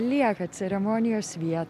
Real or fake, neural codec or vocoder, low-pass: real; none; 14.4 kHz